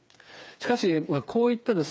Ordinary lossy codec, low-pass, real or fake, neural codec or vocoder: none; none; fake; codec, 16 kHz, 4 kbps, FreqCodec, smaller model